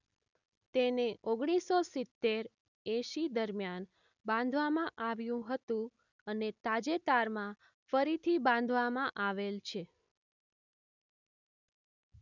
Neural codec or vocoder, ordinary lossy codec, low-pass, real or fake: none; none; 7.2 kHz; real